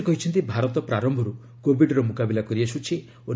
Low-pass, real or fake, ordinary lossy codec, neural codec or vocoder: none; real; none; none